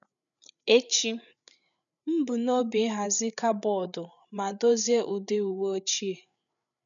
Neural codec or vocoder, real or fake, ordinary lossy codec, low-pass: codec, 16 kHz, 8 kbps, FreqCodec, larger model; fake; none; 7.2 kHz